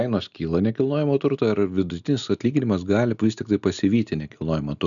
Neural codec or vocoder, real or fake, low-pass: none; real; 7.2 kHz